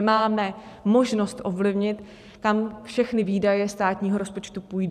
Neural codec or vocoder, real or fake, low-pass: vocoder, 44.1 kHz, 128 mel bands every 512 samples, BigVGAN v2; fake; 14.4 kHz